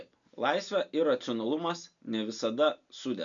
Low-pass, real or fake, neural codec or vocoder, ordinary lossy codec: 7.2 kHz; real; none; MP3, 96 kbps